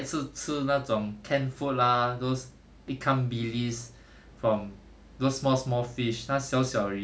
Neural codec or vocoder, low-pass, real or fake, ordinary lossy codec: none; none; real; none